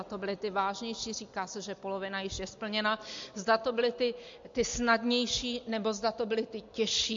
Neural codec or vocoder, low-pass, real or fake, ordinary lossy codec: none; 7.2 kHz; real; MP3, 48 kbps